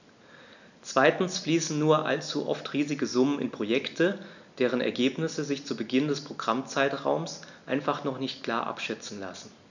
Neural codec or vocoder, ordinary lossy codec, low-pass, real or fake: none; none; 7.2 kHz; real